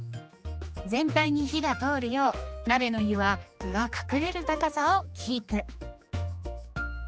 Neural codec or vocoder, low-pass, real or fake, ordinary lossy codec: codec, 16 kHz, 2 kbps, X-Codec, HuBERT features, trained on general audio; none; fake; none